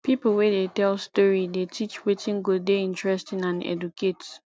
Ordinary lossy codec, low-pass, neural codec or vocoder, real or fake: none; none; none; real